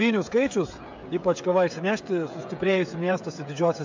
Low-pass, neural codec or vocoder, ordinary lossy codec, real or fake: 7.2 kHz; codec, 16 kHz, 16 kbps, FreqCodec, smaller model; MP3, 64 kbps; fake